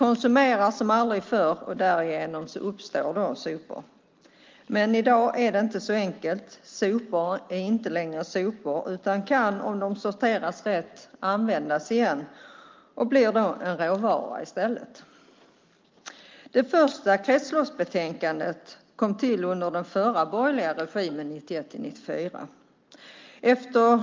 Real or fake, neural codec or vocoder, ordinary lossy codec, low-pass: real; none; Opus, 24 kbps; 7.2 kHz